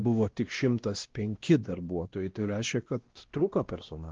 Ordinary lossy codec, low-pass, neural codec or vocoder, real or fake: Opus, 16 kbps; 7.2 kHz; codec, 16 kHz, 1 kbps, X-Codec, WavLM features, trained on Multilingual LibriSpeech; fake